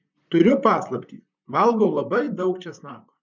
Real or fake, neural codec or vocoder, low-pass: fake; vocoder, 44.1 kHz, 128 mel bands, Pupu-Vocoder; 7.2 kHz